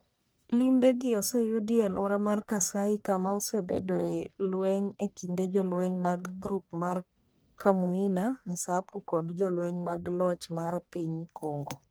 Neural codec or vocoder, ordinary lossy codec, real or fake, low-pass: codec, 44.1 kHz, 1.7 kbps, Pupu-Codec; none; fake; none